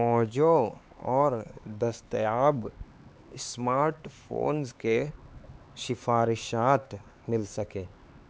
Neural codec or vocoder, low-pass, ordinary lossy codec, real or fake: codec, 16 kHz, 4 kbps, X-Codec, HuBERT features, trained on LibriSpeech; none; none; fake